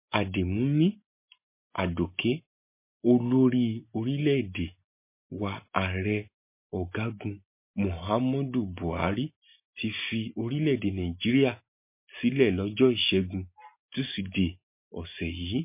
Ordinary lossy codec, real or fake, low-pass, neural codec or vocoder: MP3, 24 kbps; real; 3.6 kHz; none